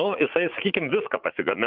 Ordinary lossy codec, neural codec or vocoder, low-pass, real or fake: Opus, 32 kbps; vocoder, 44.1 kHz, 80 mel bands, Vocos; 5.4 kHz; fake